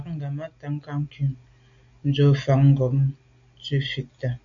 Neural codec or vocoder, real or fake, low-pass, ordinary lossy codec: none; real; 7.2 kHz; AAC, 64 kbps